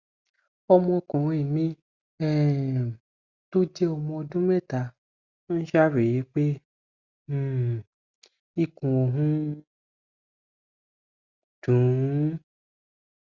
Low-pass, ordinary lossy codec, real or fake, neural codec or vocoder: 7.2 kHz; Opus, 64 kbps; real; none